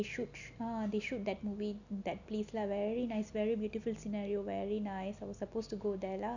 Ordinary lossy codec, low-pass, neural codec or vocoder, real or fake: none; 7.2 kHz; none; real